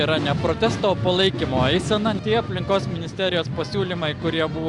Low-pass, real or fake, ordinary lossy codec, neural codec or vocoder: 10.8 kHz; real; Opus, 64 kbps; none